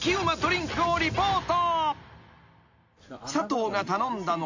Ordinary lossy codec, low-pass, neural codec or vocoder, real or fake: AAC, 48 kbps; 7.2 kHz; none; real